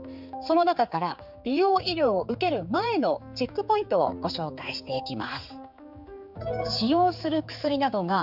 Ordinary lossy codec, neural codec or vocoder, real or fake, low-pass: none; codec, 16 kHz, 4 kbps, X-Codec, HuBERT features, trained on general audio; fake; 5.4 kHz